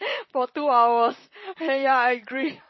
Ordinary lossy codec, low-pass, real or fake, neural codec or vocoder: MP3, 24 kbps; 7.2 kHz; fake; vocoder, 44.1 kHz, 128 mel bands, Pupu-Vocoder